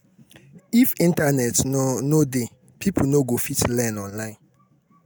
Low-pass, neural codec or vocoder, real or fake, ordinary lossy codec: none; none; real; none